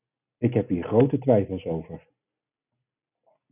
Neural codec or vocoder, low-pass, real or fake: none; 3.6 kHz; real